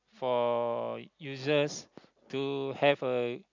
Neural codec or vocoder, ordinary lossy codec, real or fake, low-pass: none; MP3, 64 kbps; real; 7.2 kHz